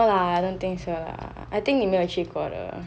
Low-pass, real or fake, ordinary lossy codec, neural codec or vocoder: none; real; none; none